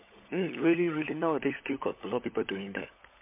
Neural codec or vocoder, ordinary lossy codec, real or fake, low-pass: codec, 16 kHz, 16 kbps, FunCodec, trained on LibriTTS, 50 frames a second; MP3, 24 kbps; fake; 3.6 kHz